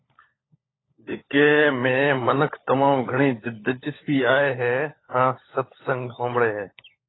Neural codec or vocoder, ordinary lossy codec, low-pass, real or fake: codec, 16 kHz, 16 kbps, FunCodec, trained on LibriTTS, 50 frames a second; AAC, 16 kbps; 7.2 kHz; fake